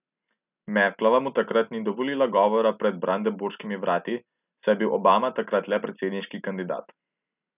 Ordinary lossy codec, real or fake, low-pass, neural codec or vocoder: none; real; 3.6 kHz; none